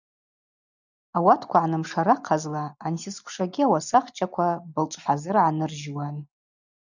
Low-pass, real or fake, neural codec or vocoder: 7.2 kHz; real; none